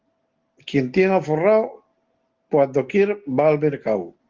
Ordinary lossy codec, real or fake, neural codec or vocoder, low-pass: Opus, 16 kbps; real; none; 7.2 kHz